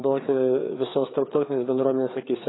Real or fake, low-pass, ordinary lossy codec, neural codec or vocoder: fake; 7.2 kHz; AAC, 16 kbps; codec, 16 kHz, 4 kbps, FreqCodec, larger model